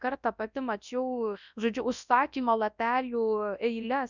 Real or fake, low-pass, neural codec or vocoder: fake; 7.2 kHz; codec, 24 kHz, 0.9 kbps, WavTokenizer, large speech release